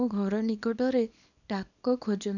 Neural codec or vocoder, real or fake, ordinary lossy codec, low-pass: codec, 24 kHz, 0.9 kbps, WavTokenizer, small release; fake; none; 7.2 kHz